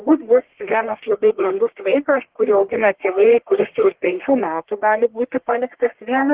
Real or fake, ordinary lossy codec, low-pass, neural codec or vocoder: fake; Opus, 16 kbps; 3.6 kHz; codec, 44.1 kHz, 1.7 kbps, Pupu-Codec